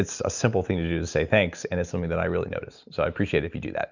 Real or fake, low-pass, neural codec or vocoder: real; 7.2 kHz; none